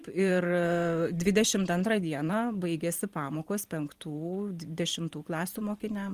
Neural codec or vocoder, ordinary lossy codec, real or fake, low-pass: vocoder, 44.1 kHz, 128 mel bands every 512 samples, BigVGAN v2; Opus, 24 kbps; fake; 14.4 kHz